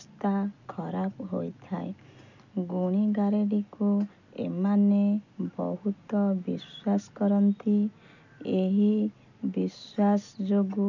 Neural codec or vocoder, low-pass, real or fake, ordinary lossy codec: none; 7.2 kHz; real; none